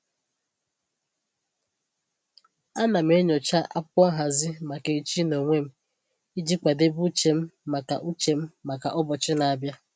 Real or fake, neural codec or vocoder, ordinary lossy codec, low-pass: real; none; none; none